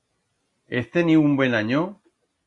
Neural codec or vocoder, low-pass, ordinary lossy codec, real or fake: none; 10.8 kHz; Opus, 64 kbps; real